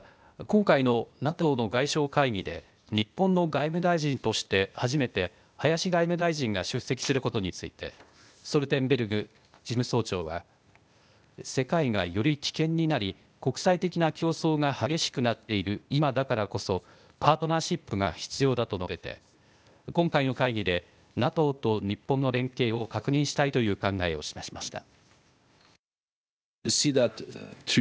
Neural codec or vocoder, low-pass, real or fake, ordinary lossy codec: codec, 16 kHz, 0.8 kbps, ZipCodec; none; fake; none